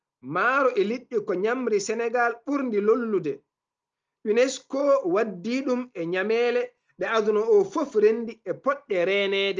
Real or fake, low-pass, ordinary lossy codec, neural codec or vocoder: real; 7.2 kHz; Opus, 24 kbps; none